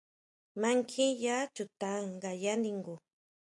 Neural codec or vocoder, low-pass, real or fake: none; 10.8 kHz; real